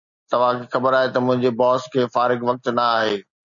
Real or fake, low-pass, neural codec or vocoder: real; 7.2 kHz; none